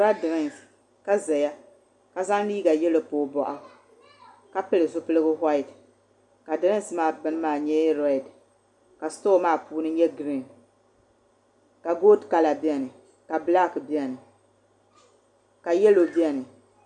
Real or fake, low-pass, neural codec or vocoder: real; 10.8 kHz; none